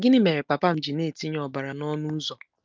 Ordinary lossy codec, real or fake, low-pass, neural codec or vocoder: Opus, 32 kbps; real; 7.2 kHz; none